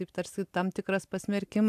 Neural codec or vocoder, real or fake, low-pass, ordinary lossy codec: none; real; 14.4 kHz; AAC, 96 kbps